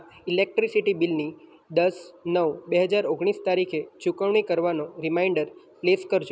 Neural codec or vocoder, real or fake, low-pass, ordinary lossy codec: none; real; none; none